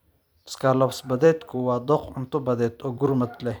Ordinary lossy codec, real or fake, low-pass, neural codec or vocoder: none; fake; none; vocoder, 44.1 kHz, 128 mel bands every 256 samples, BigVGAN v2